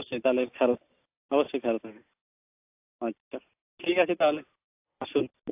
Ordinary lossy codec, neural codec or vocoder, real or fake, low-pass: AAC, 32 kbps; none; real; 3.6 kHz